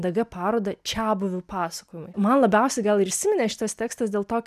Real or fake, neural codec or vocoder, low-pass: real; none; 14.4 kHz